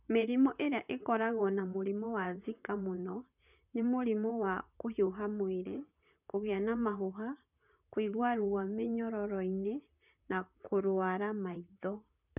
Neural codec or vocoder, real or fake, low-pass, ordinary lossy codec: vocoder, 44.1 kHz, 128 mel bands, Pupu-Vocoder; fake; 3.6 kHz; none